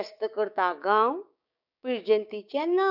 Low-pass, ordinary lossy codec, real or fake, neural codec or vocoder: 5.4 kHz; none; fake; codec, 16 kHz, 6 kbps, DAC